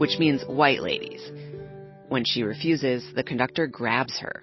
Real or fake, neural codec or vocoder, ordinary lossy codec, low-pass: real; none; MP3, 24 kbps; 7.2 kHz